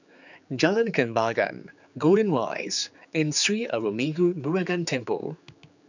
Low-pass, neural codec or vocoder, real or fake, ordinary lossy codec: 7.2 kHz; codec, 16 kHz, 4 kbps, X-Codec, HuBERT features, trained on general audio; fake; none